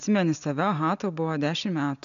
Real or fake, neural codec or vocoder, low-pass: real; none; 7.2 kHz